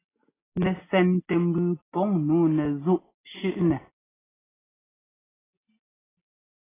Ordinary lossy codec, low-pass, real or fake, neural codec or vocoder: AAC, 16 kbps; 3.6 kHz; real; none